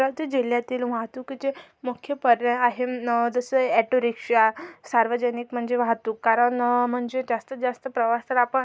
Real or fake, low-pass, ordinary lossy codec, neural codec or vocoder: real; none; none; none